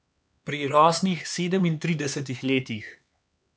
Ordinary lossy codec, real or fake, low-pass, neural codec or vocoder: none; fake; none; codec, 16 kHz, 4 kbps, X-Codec, HuBERT features, trained on LibriSpeech